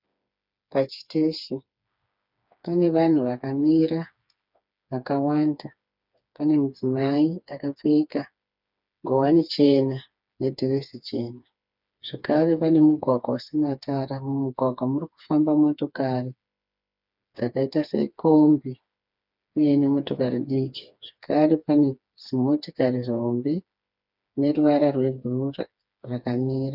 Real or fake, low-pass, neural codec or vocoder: fake; 5.4 kHz; codec, 16 kHz, 4 kbps, FreqCodec, smaller model